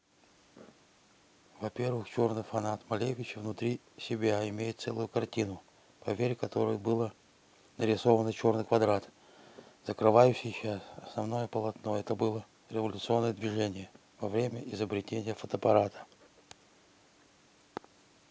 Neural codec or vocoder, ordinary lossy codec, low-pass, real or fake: none; none; none; real